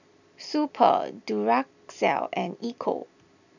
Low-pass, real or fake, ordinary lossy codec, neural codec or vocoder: 7.2 kHz; real; none; none